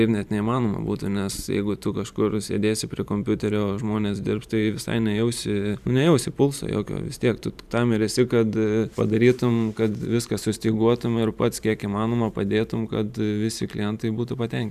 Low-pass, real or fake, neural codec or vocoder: 14.4 kHz; fake; vocoder, 44.1 kHz, 128 mel bands every 512 samples, BigVGAN v2